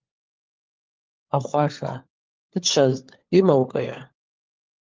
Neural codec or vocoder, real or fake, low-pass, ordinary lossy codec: codec, 16 kHz, 4 kbps, FunCodec, trained on LibriTTS, 50 frames a second; fake; 7.2 kHz; Opus, 24 kbps